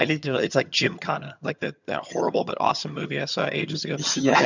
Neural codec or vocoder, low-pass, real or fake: vocoder, 22.05 kHz, 80 mel bands, HiFi-GAN; 7.2 kHz; fake